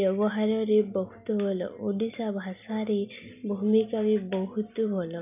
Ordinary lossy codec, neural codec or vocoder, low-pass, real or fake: none; none; 3.6 kHz; real